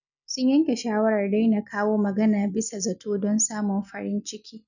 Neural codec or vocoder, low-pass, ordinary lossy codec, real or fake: none; 7.2 kHz; none; real